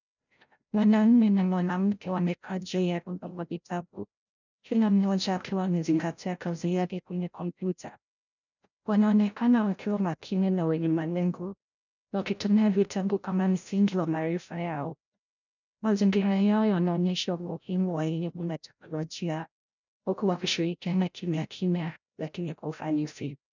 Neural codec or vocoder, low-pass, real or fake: codec, 16 kHz, 0.5 kbps, FreqCodec, larger model; 7.2 kHz; fake